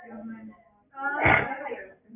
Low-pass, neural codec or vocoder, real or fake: 3.6 kHz; none; real